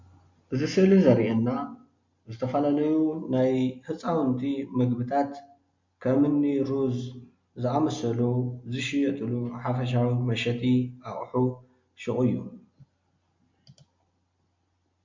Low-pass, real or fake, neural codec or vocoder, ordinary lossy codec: 7.2 kHz; real; none; MP3, 48 kbps